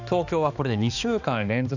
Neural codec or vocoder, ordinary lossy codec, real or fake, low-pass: codec, 16 kHz, 2 kbps, X-Codec, HuBERT features, trained on balanced general audio; none; fake; 7.2 kHz